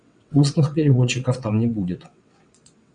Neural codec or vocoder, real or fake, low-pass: vocoder, 22.05 kHz, 80 mel bands, WaveNeXt; fake; 9.9 kHz